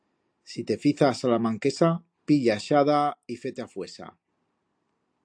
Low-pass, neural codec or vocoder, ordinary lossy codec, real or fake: 9.9 kHz; none; AAC, 64 kbps; real